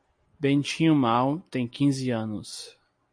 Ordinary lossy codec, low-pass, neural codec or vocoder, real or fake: MP3, 48 kbps; 9.9 kHz; none; real